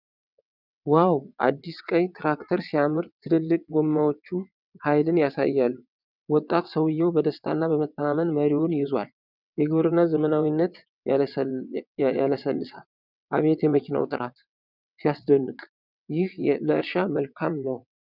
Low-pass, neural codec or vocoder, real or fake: 5.4 kHz; vocoder, 22.05 kHz, 80 mel bands, WaveNeXt; fake